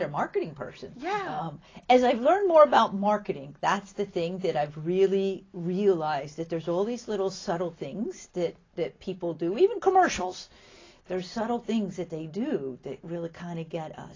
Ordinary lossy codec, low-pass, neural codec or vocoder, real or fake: AAC, 32 kbps; 7.2 kHz; none; real